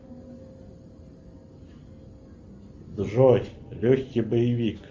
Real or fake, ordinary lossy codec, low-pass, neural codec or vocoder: real; Opus, 32 kbps; 7.2 kHz; none